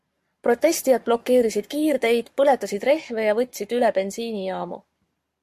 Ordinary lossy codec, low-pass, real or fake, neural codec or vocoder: MP3, 64 kbps; 14.4 kHz; fake; codec, 44.1 kHz, 7.8 kbps, Pupu-Codec